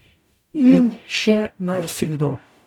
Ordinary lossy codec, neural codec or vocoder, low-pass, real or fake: none; codec, 44.1 kHz, 0.9 kbps, DAC; 19.8 kHz; fake